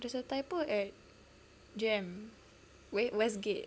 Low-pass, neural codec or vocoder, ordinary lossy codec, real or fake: none; none; none; real